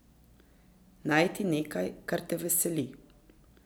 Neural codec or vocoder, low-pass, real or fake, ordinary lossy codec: none; none; real; none